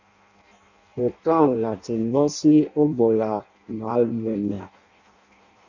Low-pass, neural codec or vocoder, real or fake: 7.2 kHz; codec, 16 kHz in and 24 kHz out, 0.6 kbps, FireRedTTS-2 codec; fake